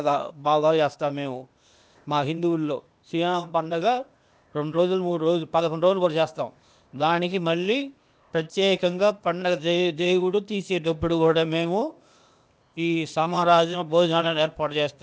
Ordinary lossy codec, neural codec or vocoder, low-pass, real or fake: none; codec, 16 kHz, 0.8 kbps, ZipCodec; none; fake